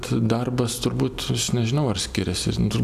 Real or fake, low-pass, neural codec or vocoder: real; 14.4 kHz; none